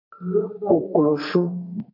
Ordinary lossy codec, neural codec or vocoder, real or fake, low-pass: MP3, 48 kbps; codec, 44.1 kHz, 2.6 kbps, SNAC; fake; 5.4 kHz